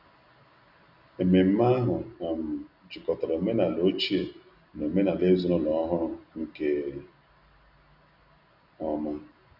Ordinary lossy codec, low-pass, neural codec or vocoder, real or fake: none; 5.4 kHz; none; real